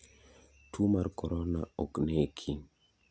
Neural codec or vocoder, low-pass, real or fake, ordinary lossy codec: none; none; real; none